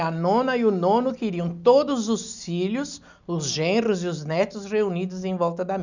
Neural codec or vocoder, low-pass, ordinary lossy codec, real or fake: none; 7.2 kHz; none; real